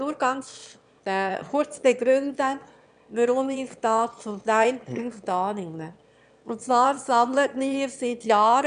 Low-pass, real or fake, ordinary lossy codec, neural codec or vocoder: 9.9 kHz; fake; none; autoencoder, 22.05 kHz, a latent of 192 numbers a frame, VITS, trained on one speaker